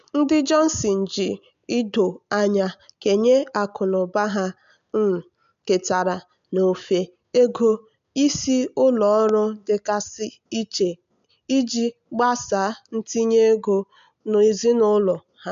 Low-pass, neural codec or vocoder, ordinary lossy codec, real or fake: 7.2 kHz; none; MP3, 64 kbps; real